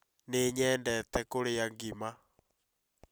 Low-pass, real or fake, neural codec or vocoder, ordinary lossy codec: none; real; none; none